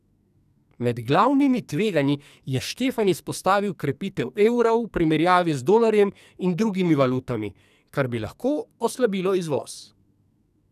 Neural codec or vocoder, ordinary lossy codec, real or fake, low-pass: codec, 44.1 kHz, 2.6 kbps, SNAC; none; fake; 14.4 kHz